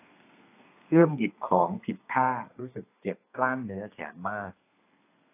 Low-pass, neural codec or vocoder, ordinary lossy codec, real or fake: 3.6 kHz; codec, 32 kHz, 1.9 kbps, SNAC; AAC, 24 kbps; fake